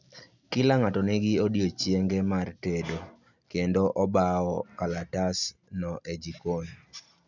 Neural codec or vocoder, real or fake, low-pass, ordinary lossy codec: none; real; 7.2 kHz; none